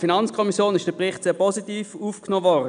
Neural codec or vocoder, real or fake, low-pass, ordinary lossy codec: none; real; 9.9 kHz; none